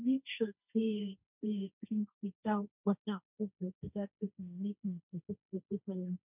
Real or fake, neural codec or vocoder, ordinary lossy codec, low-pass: fake; codec, 16 kHz, 1.1 kbps, Voila-Tokenizer; none; 3.6 kHz